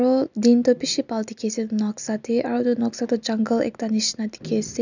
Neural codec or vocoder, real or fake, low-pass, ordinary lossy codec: none; real; 7.2 kHz; none